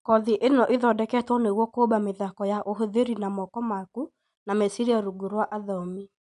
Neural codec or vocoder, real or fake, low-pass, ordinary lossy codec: none; real; 10.8 kHz; MP3, 64 kbps